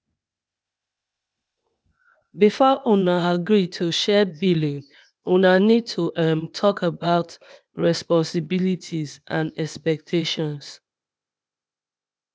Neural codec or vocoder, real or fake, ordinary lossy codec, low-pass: codec, 16 kHz, 0.8 kbps, ZipCodec; fake; none; none